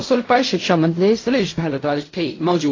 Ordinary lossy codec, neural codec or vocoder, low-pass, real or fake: AAC, 32 kbps; codec, 16 kHz in and 24 kHz out, 0.4 kbps, LongCat-Audio-Codec, fine tuned four codebook decoder; 7.2 kHz; fake